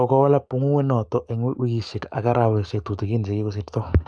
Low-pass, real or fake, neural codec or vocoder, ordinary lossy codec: 9.9 kHz; fake; codec, 44.1 kHz, 7.8 kbps, DAC; none